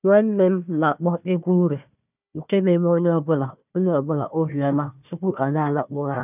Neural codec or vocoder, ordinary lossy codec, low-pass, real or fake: codec, 16 kHz, 1 kbps, FunCodec, trained on Chinese and English, 50 frames a second; none; 3.6 kHz; fake